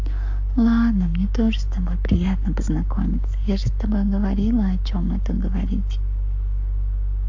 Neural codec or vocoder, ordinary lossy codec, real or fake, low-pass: codec, 44.1 kHz, 7.8 kbps, Pupu-Codec; AAC, 48 kbps; fake; 7.2 kHz